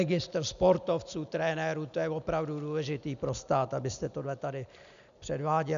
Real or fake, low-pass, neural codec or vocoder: real; 7.2 kHz; none